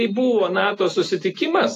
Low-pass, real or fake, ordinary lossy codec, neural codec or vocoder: 14.4 kHz; real; AAC, 48 kbps; none